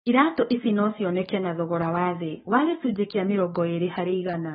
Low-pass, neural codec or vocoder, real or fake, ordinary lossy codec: 19.8 kHz; codec, 44.1 kHz, 7.8 kbps, DAC; fake; AAC, 16 kbps